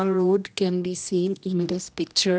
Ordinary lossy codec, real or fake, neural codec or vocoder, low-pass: none; fake; codec, 16 kHz, 1 kbps, X-Codec, HuBERT features, trained on general audio; none